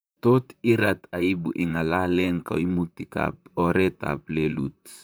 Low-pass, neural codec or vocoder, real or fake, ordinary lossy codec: none; vocoder, 44.1 kHz, 128 mel bands, Pupu-Vocoder; fake; none